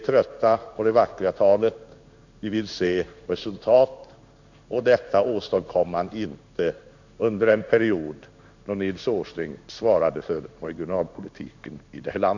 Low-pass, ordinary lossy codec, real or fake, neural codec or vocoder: 7.2 kHz; none; fake; codec, 16 kHz in and 24 kHz out, 1 kbps, XY-Tokenizer